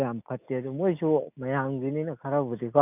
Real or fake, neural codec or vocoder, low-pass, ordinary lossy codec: real; none; 3.6 kHz; none